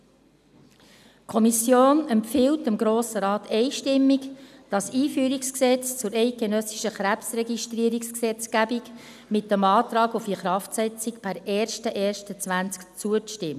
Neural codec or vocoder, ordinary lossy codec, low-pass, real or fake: none; none; 14.4 kHz; real